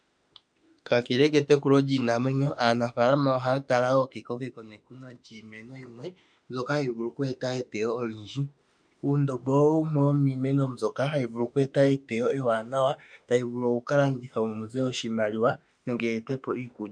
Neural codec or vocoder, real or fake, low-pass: autoencoder, 48 kHz, 32 numbers a frame, DAC-VAE, trained on Japanese speech; fake; 9.9 kHz